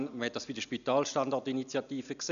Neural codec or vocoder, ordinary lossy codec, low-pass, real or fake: none; none; 7.2 kHz; real